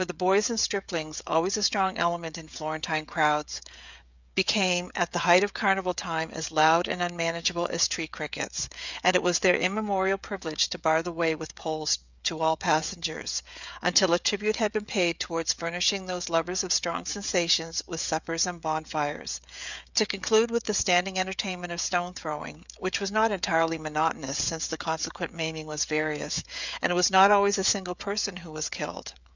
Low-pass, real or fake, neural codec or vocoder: 7.2 kHz; fake; codec, 16 kHz, 16 kbps, FreqCodec, smaller model